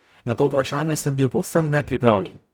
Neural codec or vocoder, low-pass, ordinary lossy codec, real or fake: codec, 44.1 kHz, 0.9 kbps, DAC; none; none; fake